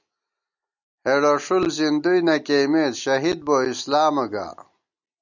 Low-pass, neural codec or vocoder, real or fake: 7.2 kHz; none; real